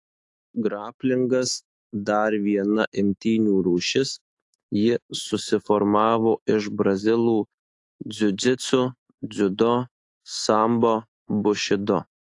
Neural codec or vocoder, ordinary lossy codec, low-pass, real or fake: autoencoder, 48 kHz, 128 numbers a frame, DAC-VAE, trained on Japanese speech; AAC, 48 kbps; 10.8 kHz; fake